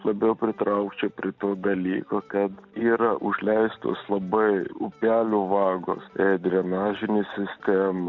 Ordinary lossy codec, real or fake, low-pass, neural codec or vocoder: MP3, 64 kbps; real; 7.2 kHz; none